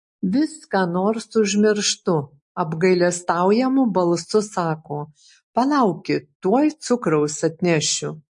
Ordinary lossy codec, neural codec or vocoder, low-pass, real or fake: MP3, 48 kbps; none; 10.8 kHz; real